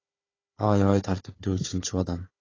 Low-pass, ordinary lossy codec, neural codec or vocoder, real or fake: 7.2 kHz; AAC, 32 kbps; codec, 16 kHz, 4 kbps, FunCodec, trained on Chinese and English, 50 frames a second; fake